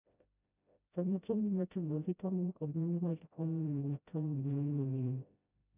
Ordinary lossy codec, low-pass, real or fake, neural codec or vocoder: none; 3.6 kHz; fake; codec, 16 kHz, 0.5 kbps, FreqCodec, smaller model